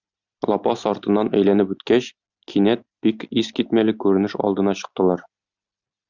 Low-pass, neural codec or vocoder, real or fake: 7.2 kHz; none; real